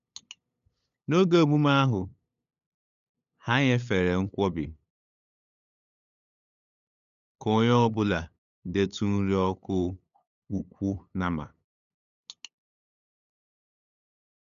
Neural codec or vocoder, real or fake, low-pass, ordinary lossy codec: codec, 16 kHz, 8 kbps, FunCodec, trained on LibriTTS, 25 frames a second; fake; 7.2 kHz; none